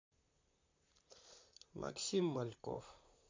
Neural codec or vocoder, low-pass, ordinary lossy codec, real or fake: vocoder, 44.1 kHz, 128 mel bands, Pupu-Vocoder; 7.2 kHz; MP3, 48 kbps; fake